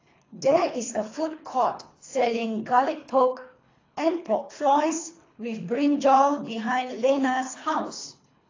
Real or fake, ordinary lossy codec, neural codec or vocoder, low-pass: fake; AAC, 32 kbps; codec, 24 kHz, 3 kbps, HILCodec; 7.2 kHz